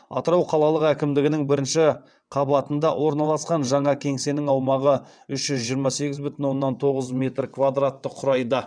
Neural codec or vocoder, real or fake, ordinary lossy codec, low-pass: vocoder, 22.05 kHz, 80 mel bands, WaveNeXt; fake; none; 9.9 kHz